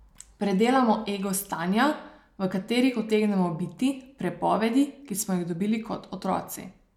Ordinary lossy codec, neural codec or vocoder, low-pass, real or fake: MP3, 96 kbps; none; 19.8 kHz; real